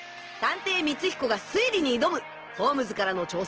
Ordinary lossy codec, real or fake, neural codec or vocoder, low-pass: Opus, 16 kbps; real; none; 7.2 kHz